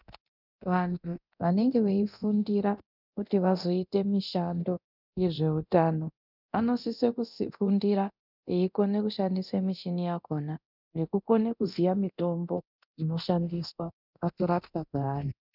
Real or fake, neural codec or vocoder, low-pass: fake; codec, 24 kHz, 0.9 kbps, DualCodec; 5.4 kHz